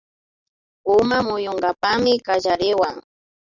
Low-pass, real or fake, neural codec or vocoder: 7.2 kHz; real; none